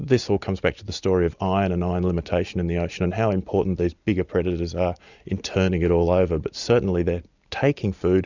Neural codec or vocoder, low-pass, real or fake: none; 7.2 kHz; real